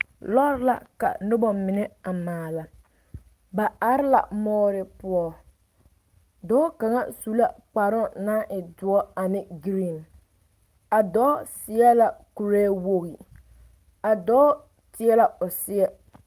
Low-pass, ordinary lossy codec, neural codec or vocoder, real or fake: 14.4 kHz; Opus, 32 kbps; none; real